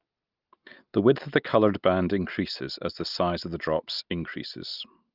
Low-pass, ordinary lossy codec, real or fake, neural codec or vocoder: 5.4 kHz; Opus, 24 kbps; real; none